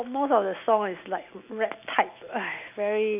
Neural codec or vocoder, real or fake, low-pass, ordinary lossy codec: none; real; 3.6 kHz; none